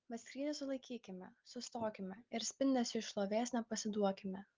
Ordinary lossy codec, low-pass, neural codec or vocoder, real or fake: Opus, 32 kbps; 7.2 kHz; none; real